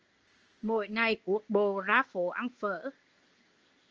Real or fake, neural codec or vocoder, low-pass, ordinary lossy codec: fake; codec, 16 kHz in and 24 kHz out, 1 kbps, XY-Tokenizer; 7.2 kHz; Opus, 24 kbps